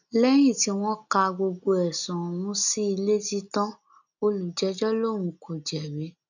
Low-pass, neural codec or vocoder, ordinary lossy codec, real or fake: 7.2 kHz; none; none; real